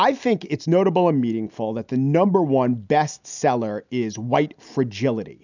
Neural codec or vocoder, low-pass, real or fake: none; 7.2 kHz; real